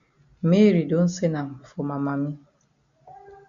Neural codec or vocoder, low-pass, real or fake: none; 7.2 kHz; real